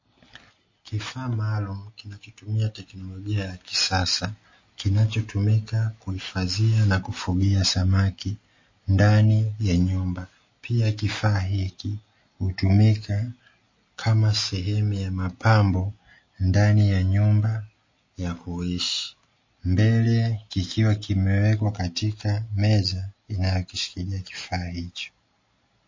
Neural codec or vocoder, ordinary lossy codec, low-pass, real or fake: none; MP3, 32 kbps; 7.2 kHz; real